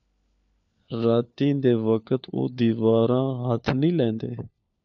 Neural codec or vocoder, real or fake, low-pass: codec, 16 kHz, 4 kbps, FunCodec, trained on LibriTTS, 50 frames a second; fake; 7.2 kHz